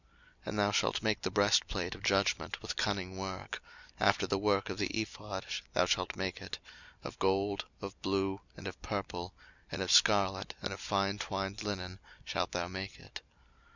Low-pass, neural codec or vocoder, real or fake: 7.2 kHz; none; real